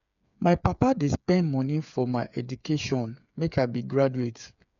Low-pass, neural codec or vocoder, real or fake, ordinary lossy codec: 7.2 kHz; codec, 16 kHz, 8 kbps, FreqCodec, smaller model; fake; none